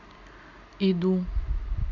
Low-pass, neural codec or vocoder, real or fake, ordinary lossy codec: 7.2 kHz; none; real; Opus, 64 kbps